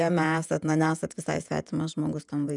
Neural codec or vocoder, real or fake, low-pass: vocoder, 48 kHz, 128 mel bands, Vocos; fake; 10.8 kHz